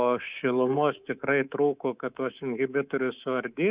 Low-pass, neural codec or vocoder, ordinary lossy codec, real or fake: 3.6 kHz; codec, 24 kHz, 3.1 kbps, DualCodec; Opus, 64 kbps; fake